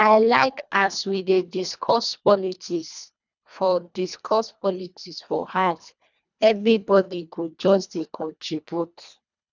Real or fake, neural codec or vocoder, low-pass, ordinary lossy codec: fake; codec, 24 kHz, 1.5 kbps, HILCodec; 7.2 kHz; none